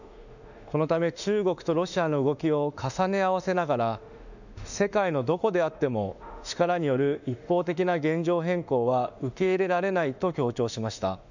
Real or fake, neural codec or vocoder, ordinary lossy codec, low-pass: fake; autoencoder, 48 kHz, 32 numbers a frame, DAC-VAE, trained on Japanese speech; none; 7.2 kHz